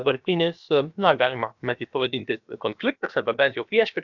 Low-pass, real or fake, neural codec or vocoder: 7.2 kHz; fake; codec, 16 kHz, about 1 kbps, DyCAST, with the encoder's durations